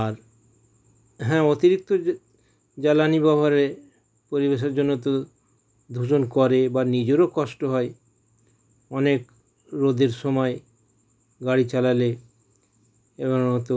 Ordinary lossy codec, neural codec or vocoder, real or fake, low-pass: none; none; real; none